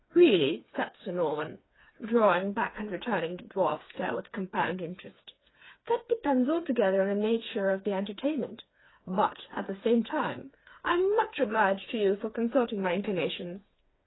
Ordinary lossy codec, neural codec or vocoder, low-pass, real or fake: AAC, 16 kbps; codec, 16 kHz, 4 kbps, FreqCodec, smaller model; 7.2 kHz; fake